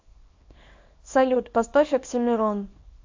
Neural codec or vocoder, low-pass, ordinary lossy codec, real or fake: codec, 24 kHz, 0.9 kbps, WavTokenizer, small release; 7.2 kHz; AAC, 48 kbps; fake